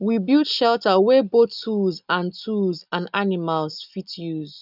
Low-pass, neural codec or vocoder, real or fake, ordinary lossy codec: 5.4 kHz; none; real; none